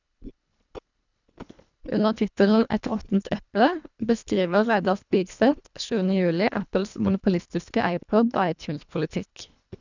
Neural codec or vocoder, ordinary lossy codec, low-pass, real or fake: codec, 24 kHz, 1.5 kbps, HILCodec; none; 7.2 kHz; fake